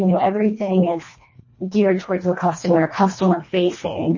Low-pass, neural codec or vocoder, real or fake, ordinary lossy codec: 7.2 kHz; codec, 24 kHz, 1.5 kbps, HILCodec; fake; MP3, 32 kbps